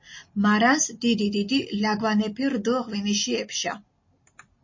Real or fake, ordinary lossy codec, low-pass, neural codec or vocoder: real; MP3, 32 kbps; 7.2 kHz; none